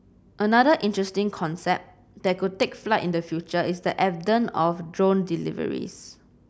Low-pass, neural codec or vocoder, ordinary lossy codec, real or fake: none; none; none; real